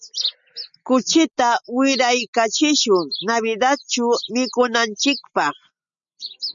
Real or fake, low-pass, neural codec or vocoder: real; 7.2 kHz; none